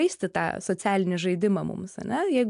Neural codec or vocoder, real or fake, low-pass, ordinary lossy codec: none; real; 10.8 kHz; AAC, 96 kbps